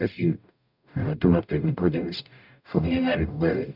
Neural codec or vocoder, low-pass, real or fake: codec, 44.1 kHz, 0.9 kbps, DAC; 5.4 kHz; fake